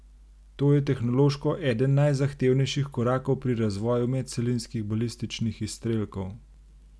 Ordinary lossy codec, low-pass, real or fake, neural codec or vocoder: none; none; real; none